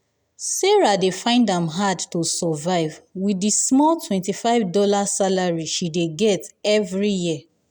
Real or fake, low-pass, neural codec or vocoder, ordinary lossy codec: real; none; none; none